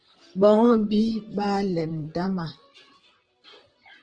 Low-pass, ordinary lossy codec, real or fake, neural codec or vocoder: 9.9 kHz; Opus, 16 kbps; fake; vocoder, 44.1 kHz, 128 mel bands, Pupu-Vocoder